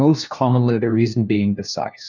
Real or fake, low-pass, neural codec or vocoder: fake; 7.2 kHz; codec, 16 kHz, 1 kbps, FunCodec, trained on LibriTTS, 50 frames a second